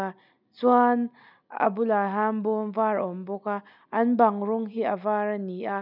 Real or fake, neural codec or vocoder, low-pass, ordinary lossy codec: real; none; 5.4 kHz; none